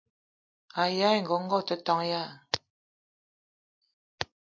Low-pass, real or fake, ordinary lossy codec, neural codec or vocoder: 7.2 kHz; real; MP3, 48 kbps; none